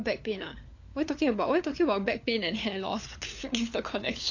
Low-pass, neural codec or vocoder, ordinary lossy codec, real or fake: 7.2 kHz; codec, 16 kHz, 4 kbps, FunCodec, trained on LibriTTS, 50 frames a second; AAC, 48 kbps; fake